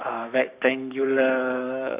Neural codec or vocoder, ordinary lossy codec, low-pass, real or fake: codec, 44.1 kHz, 7.8 kbps, Pupu-Codec; Opus, 64 kbps; 3.6 kHz; fake